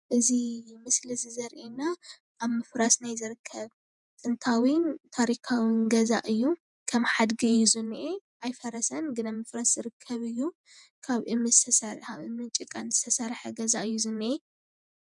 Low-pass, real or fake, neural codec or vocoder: 10.8 kHz; fake; vocoder, 48 kHz, 128 mel bands, Vocos